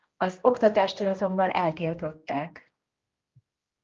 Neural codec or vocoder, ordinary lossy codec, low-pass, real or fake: codec, 16 kHz, 1 kbps, X-Codec, HuBERT features, trained on general audio; Opus, 32 kbps; 7.2 kHz; fake